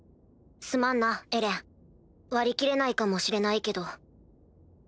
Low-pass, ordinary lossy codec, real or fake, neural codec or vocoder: none; none; real; none